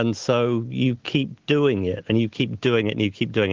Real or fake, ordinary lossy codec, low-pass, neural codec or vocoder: real; Opus, 16 kbps; 7.2 kHz; none